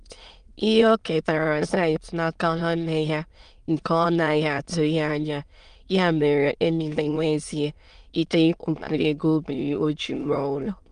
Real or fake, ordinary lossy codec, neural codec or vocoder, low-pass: fake; Opus, 32 kbps; autoencoder, 22.05 kHz, a latent of 192 numbers a frame, VITS, trained on many speakers; 9.9 kHz